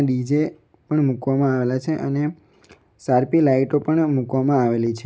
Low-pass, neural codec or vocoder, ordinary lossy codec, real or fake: none; none; none; real